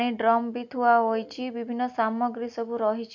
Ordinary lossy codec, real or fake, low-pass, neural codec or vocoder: none; real; 7.2 kHz; none